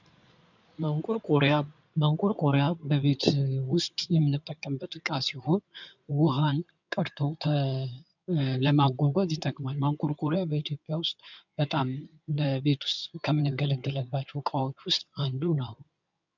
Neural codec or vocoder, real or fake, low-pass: codec, 16 kHz in and 24 kHz out, 2.2 kbps, FireRedTTS-2 codec; fake; 7.2 kHz